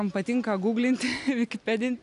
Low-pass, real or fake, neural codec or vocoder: 10.8 kHz; real; none